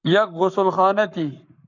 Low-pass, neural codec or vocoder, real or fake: 7.2 kHz; codec, 44.1 kHz, 7.8 kbps, Pupu-Codec; fake